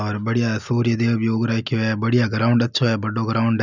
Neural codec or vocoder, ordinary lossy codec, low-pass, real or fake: none; none; 7.2 kHz; real